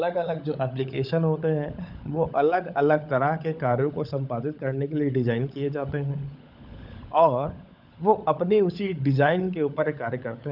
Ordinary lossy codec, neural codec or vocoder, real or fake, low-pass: none; codec, 16 kHz, 16 kbps, FunCodec, trained on LibriTTS, 50 frames a second; fake; 5.4 kHz